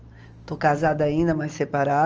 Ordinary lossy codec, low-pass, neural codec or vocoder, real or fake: Opus, 24 kbps; 7.2 kHz; none; real